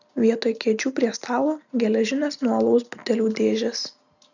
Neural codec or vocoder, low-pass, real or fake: none; 7.2 kHz; real